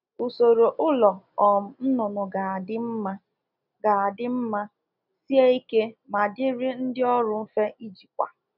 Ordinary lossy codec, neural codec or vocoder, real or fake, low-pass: none; none; real; 5.4 kHz